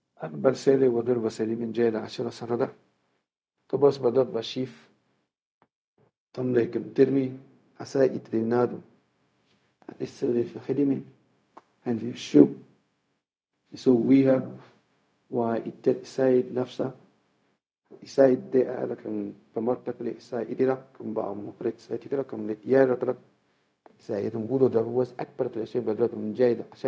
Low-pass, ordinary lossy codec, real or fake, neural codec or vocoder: none; none; fake; codec, 16 kHz, 0.4 kbps, LongCat-Audio-Codec